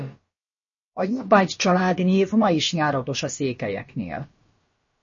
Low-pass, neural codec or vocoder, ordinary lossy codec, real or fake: 7.2 kHz; codec, 16 kHz, about 1 kbps, DyCAST, with the encoder's durations; MP3, 32 kbps; fake